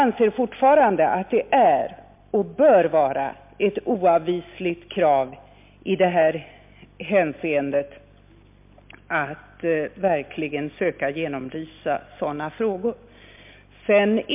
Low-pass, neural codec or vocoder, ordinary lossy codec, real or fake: 3.6 kHz; none; MP3, 32 kbps; real